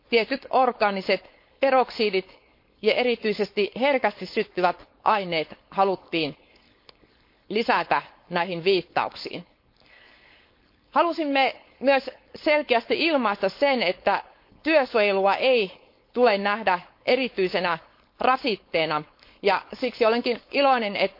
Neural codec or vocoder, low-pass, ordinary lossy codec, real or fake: codec, 16 kHz, 4.8 kbps, FACodec; 5.4 kHz; MP3, 32 kbps; fake